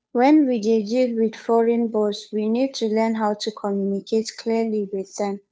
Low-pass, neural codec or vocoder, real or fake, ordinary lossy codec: none; codec, 16 kHz, 2 kbps, FunCodec, trained on Chinese and English, 25 frames a second; fake; none